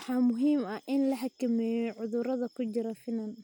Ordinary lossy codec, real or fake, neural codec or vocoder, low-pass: none; real; none; 19.8 kHz